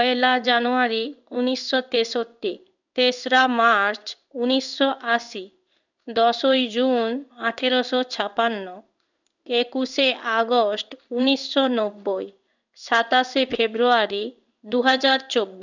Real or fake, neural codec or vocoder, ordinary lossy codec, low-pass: fake; codec, 16 kHz in and 24 kHz out, 1 kbps, XY-Tokenizer; none; 7.2 kHz